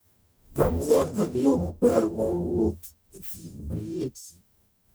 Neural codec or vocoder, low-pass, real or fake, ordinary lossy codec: codec, 44.1 kHz, 0.9 kbps, DAC; none; fake; none